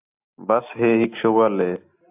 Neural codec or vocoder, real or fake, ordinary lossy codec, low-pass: none; real; AAC, 32 kbps; 3.6 kHz